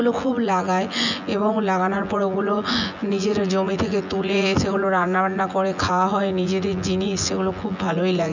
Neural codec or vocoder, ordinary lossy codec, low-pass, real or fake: vocoder, 24 kHz, 100 mel bands, Vocos; none; 7.2 kHz; fake